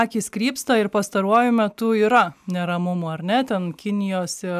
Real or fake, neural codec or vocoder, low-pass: real; none; 14.4 kHz